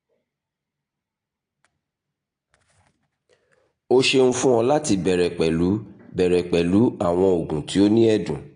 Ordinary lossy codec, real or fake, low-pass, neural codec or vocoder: AAC, 48 kbps; real; 9.9 kHz; none